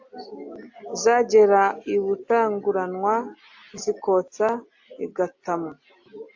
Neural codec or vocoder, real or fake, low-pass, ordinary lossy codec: none; real; 7.2 kHz; AAC, 48 kbps